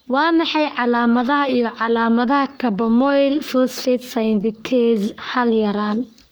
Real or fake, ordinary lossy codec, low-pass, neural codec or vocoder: fake; none; none; codec, 44.1 kHz, 3.4 kbps, Pupu-Codec